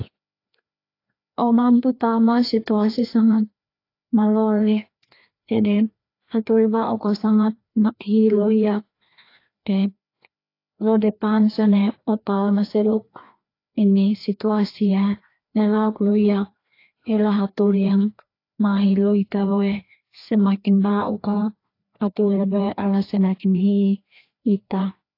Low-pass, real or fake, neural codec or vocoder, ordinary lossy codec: 5.4 kHz; fake; codec, 16 kHz, 2 kbps, FreqCodec, larger model; AAC, 32 kbps